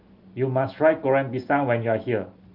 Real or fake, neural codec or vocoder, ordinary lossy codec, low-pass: real; none; Opus, 24 kbps; 5.4 kHz